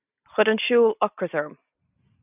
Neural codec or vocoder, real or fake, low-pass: none; real; 3.6 kHz